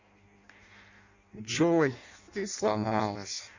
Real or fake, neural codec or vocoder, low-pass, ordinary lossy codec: fake; codec, 16 kHz in and 24 kHz out, 0.6 kbps, FireRedTTS-2 codec; 7.2 kHz; Opus, 64 kbps